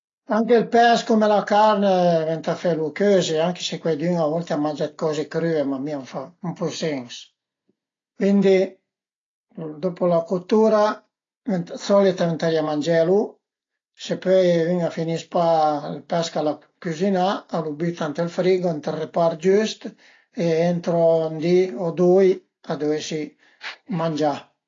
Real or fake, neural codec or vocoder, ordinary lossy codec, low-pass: real; none; AAC, 32 kbps; 7.2 kHz